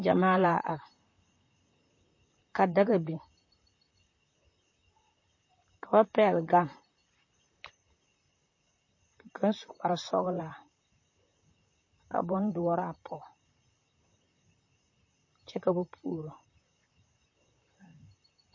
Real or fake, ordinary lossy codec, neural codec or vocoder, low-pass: fake; MP3, 32 kbps; vocoder, 44.1 kHz, 128 mel bands, Pupu-Vocoder; 7.2 kHz